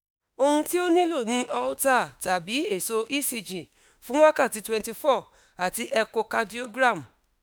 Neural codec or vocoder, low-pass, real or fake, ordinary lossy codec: autoencoder, 48 kHz, 32 numbers a frame, DAC-VAE, trained on Japanese speech; none; fake; none